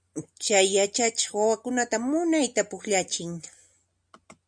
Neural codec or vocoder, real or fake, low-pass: none; real; 9.9 kHz